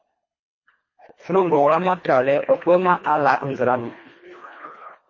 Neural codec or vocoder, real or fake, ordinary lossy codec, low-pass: codec, 24 kHz, 1.5 kbps, HILCodec; fake; MP3, 32 kbps; 7.2 kHz